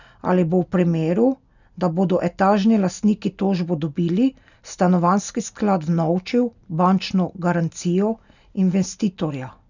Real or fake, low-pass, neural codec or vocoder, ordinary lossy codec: real; 7.2 kHz; none; none